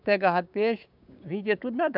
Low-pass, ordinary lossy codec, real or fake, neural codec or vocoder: 5.4 kHz; none; fake; codec, 16 kHz, 6 kbps, DAC